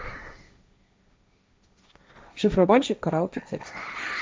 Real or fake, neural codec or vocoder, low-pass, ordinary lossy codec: fake; codec, 16 kHz, 1.1 kbps, Voila-Tokenizer; none; none